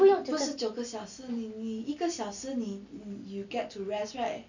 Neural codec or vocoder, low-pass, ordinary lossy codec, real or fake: none; 7.2 kHz; none; real